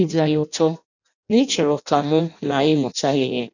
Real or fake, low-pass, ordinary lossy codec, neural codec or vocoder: fake; 7.2 kHz; none; codec, 16 kHz in and 24 kHz out, 0.6 kbps, FireRedTTS-2 codec